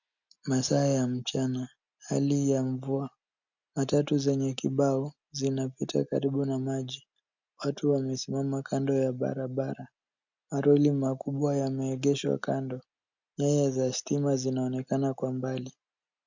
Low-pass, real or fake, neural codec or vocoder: 7.2 kHz; real; none